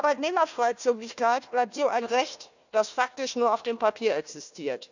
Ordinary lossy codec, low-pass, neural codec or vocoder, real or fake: none; 7.2 kHz; codec, 16 kHz, 1 kbps, FunCodec, trained on Chinese and English, 50 frames a second; fake